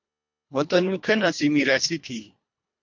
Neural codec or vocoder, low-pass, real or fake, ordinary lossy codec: codec, 24 kHz, 1.5 kbps, HILCodec; 7.2 kHz; fake; MP3, 48 kbps